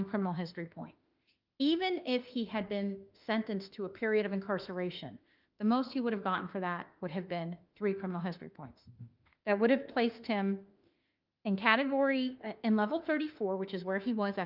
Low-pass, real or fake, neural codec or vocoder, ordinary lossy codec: 5.4 kHz; fake; autoencoder, 48 kHz, 32 numbers a frame, DAC-VAE, trained on Japanese speech; Opus, 32 kbps